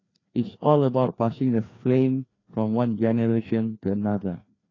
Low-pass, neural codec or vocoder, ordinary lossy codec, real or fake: 7.2 kHz; codec, 16 kHz, 2 kbps, FreqCodec, larger model; AAC, 32 kbps; fake